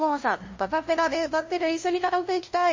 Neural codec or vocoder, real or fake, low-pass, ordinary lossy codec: codec, 16 kHz, 0.5 kbps, FunCodec, trained on LibriTTS, 25 frames a second; fake; 7.2 kHz; MP3, 32 kbps